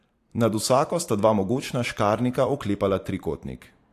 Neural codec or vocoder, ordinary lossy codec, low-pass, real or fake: vocoder, 44.1 kHz, 128 mel bands every 512 samples, BigVGAN v2; AAC, 64 kbps; 14.4 kHz; fake